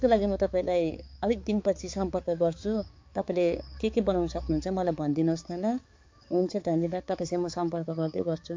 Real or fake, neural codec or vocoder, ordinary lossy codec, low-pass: fake; codec, 16 kHz, 4 kbps, X-Codec, HuBERT features, trained on balanced general audio; MP3, 48 kbps; 7.2 kHz